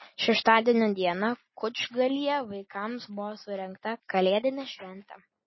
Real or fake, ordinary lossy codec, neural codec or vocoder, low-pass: real; MP3, 24 kbps; none; 7.2 kHz